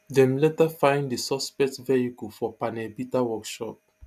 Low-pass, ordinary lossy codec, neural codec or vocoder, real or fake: 14.4 kHz; none; none; real